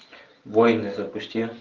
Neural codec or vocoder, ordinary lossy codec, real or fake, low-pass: none; Opus, 24 kbps; real; 7.2 kHz